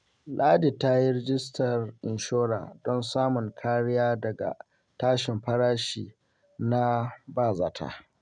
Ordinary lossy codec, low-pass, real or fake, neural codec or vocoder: none; 9.9 kHz; real; none